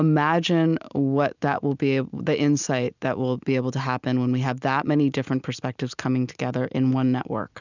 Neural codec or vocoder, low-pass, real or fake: none; 7.2 kHz; real